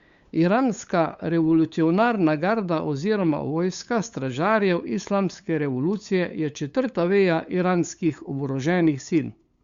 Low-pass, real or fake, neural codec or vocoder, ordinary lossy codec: 7.2 kHz; fake; codec, 16 kHz, 8 kbps, FunCodec, trained on LibriTTS, 25 frames a second; Opus, 64 kbps